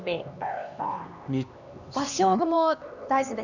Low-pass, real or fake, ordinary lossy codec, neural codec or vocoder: 7.2 kHz; fake; none; codec, 16 kHz, 2 kbps, X-Codec, HuBERT features, trained on LibriSpeech